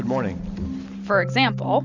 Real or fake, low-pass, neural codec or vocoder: real; 7.2 kHz; none